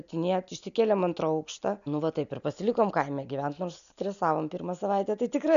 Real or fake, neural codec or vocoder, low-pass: real; none; 7.2 kHz